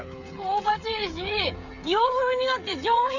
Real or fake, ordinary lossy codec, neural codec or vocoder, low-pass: fake; none; codec, 16 kHz, 8 kbps, FreqCodec, smaller model; 7.2 kHz